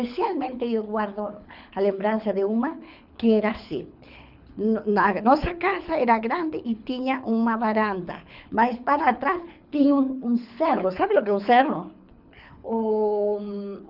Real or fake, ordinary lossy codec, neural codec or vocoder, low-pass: fake; none; codec, 24 kHz, 6 kbps, HILCodec; 5.4 kHz